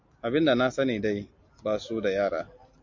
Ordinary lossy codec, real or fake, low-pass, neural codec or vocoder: MP3, 48 kbps; real; 7.2 kHz; none